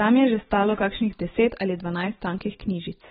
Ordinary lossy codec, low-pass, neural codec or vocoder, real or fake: AAC, 16 kbps; 19.8 kHz; none; real